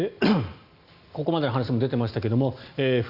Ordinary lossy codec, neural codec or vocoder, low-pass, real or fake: Opus, 64 kbps; none; 5.4 kHz; real